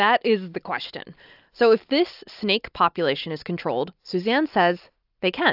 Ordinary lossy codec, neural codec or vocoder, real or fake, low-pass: AAC, 48 kbps; none; real; 5.4 kHz